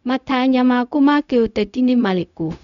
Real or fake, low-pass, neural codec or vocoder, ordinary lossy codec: fake; 7.2 kHz; codec, 16 kHz, 0.4 kbps, LongCat-Audio-Codec; none